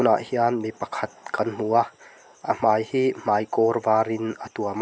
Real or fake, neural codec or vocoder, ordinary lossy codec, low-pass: real; none; none; none